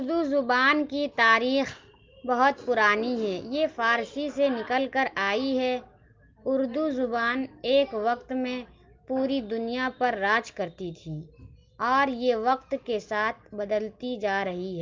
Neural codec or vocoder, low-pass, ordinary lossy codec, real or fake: none; 7.2 kHz; Opus, 32 kbps; real